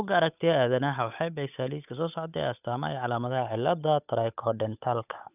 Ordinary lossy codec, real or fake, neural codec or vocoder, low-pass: none; fake; codec, 16 kHz, 8 kbps, FunCodec, trained on Chinese and English, 25 frames a second; 3.6 kHz